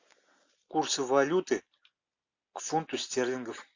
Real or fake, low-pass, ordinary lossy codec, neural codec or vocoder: real; 7.2 kHz; AAC, 48 kbps; none